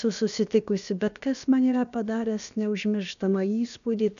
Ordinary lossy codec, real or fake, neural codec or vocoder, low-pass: MP3, 96 kbps; fake; codec, 16 kHz, about 1 kbps, DyCAST, with the encoder's durations; 7.2 kHz